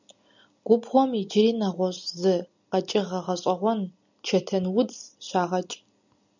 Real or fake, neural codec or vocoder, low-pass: real; none; 7.2 kHz